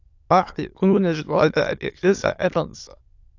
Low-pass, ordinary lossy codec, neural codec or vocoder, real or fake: 7.2 kHz; AAC, 48 kbps; autoencoder, 22.05 kHz, a latent of 192 numbers a frame, VITS, trained on many speakers; fake